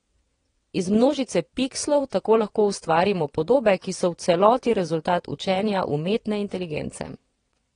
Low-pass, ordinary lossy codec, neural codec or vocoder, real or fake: 9.9 kHz; AAC, 32 kbps; vocoder, 22.05 kHz, 80 mel bands, WaveNeXt; fake